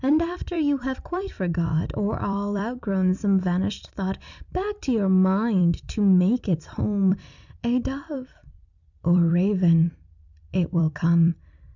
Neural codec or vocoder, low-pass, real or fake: vocoder, 44.1 kHz, 128 mel bands every 256 samples, BigVGAN v2; 7.2 kHz; fake